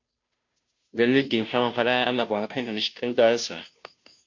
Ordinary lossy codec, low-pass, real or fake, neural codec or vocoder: MP3, 48 kbps; 7.2 kHz; fake; codec, 16 kHz, 0.5 kbps, FunCodec, trained on Chinese and English, 25 frames a second